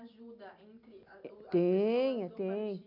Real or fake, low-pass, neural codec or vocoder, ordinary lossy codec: real; 5.4 kHz; none; none